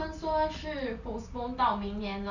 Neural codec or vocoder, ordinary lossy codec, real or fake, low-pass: none; none; real; 7.2 kHz